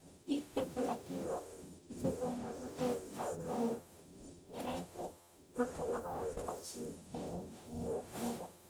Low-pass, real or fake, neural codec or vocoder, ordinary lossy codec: none; fake; codec, 44.1 kHz, 0.9 kbps, DAC; none